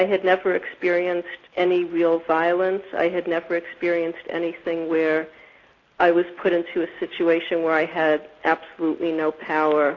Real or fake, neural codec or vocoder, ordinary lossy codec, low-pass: real; none; AAC, 32 kbps; 7.2 kHz